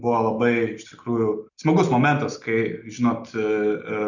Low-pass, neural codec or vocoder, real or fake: 7.2 kHz; none; real